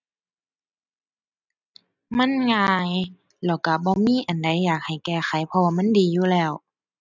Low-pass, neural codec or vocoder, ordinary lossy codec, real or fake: 7.2 kHz; none; none; real